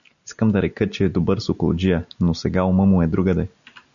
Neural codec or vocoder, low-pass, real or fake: none; 7.2 kHz; real